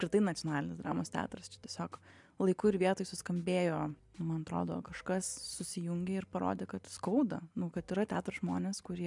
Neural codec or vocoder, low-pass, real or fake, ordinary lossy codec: none; 10.8 kHz; real; MP3, 96 kbps